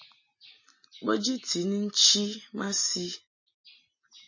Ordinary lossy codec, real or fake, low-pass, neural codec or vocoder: MP3, 32 kbps; real; 7.2 kHz; none